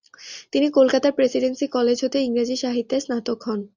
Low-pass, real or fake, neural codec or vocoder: 7.2 kHz; real; none